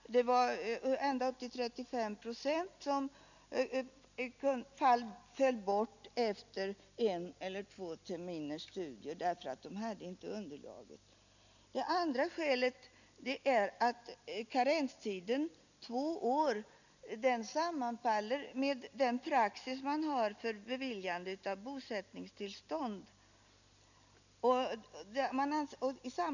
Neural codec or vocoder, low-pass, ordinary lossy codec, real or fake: none; 7.2 kHz; none; real